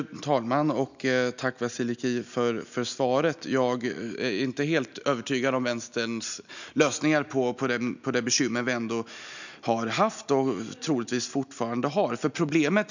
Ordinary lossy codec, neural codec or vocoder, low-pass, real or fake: none; none; 7.2 kHz; real